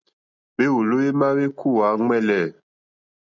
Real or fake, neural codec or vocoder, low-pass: real; none; 7.2 kHz